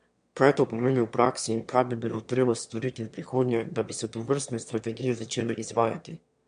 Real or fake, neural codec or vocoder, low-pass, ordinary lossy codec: fake; autoencoder, 22.05 kHz, a latent of 192 numbers a frame, VITS, trained on one speaker; 9.9 kHz; MP3, 64 kbps